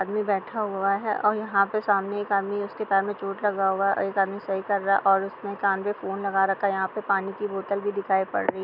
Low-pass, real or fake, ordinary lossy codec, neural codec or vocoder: 5.4 kHz; real; none; none